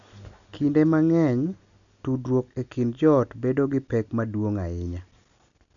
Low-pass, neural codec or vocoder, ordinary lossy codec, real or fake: 7.2 kHz; none; none; real